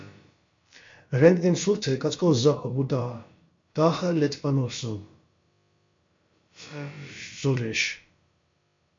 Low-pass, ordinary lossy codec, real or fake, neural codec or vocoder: 7.2 kHz; MP3, 48 kbps; fake; codec, 16 kHz, about 1 kbps, DyCAST, with the encoder's durations